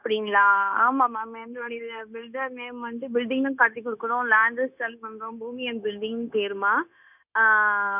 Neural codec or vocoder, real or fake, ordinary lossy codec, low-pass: codec, 16 kHz, 0.9 kbps, LongCat-Audio-Codec; fake; none; 3.6 kHz